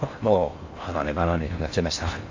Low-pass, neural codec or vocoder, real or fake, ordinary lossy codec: 7.2 kHz; codec, 16 kHz in and 24 kHz out, 0.6 kbps, FocalCodec, streaming, 4096 codes; fake; AAC, 48 kbps